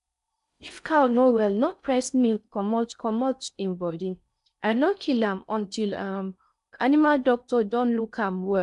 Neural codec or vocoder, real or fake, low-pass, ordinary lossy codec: codec, 16 kHz in and 24 kHz out, 0.6 kbps, FocalCodec, streaming, 4096 codes; fake; 10.8 kHz; none